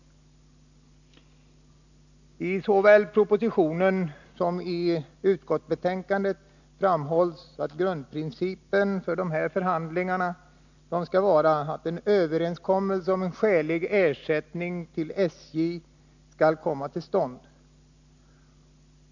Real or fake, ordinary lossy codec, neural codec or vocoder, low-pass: real; none; none; 7.2 kHz